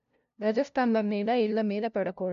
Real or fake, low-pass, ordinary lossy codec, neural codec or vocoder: fake; 7.2 kHz; none; codec, 16 kHz, 0.5 kbps, FunCodec, trained on LibriTTS, 25 frames a second